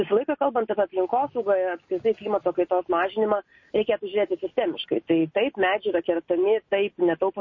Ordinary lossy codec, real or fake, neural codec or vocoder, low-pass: MP3, 32 kbps; real; none; 7.2 kHz